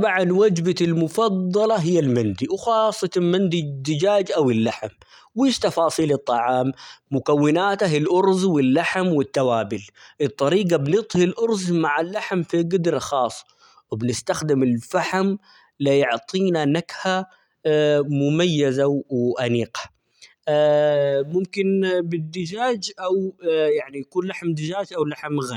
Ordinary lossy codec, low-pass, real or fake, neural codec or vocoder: none; 19.8 kHz; real; none